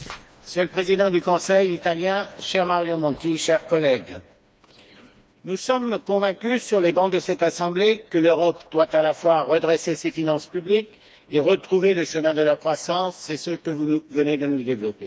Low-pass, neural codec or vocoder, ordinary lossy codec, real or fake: none; codec, 16 kHz, 2 kbps, FreqCodec, smaller model; none; fake